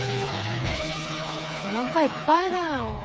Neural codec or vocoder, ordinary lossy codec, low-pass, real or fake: codec, 16 kHz, 4 kbps, FreqCodec, smaller model; none; none; fake